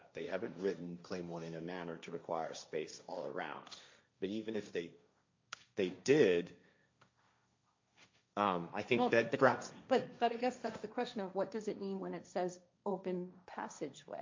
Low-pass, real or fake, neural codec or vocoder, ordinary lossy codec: 7.2 kHz; fake; codec, 16 kHz, 1.1 kbps, Voila-Tokenizer; MP3, 48 kbps